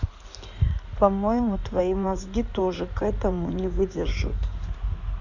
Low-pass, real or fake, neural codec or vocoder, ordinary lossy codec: 7.2 kHz; fake; codec, 16 kHz in and 24 kHz out, 2.2 kbps, FireRedTTS-2 codec; none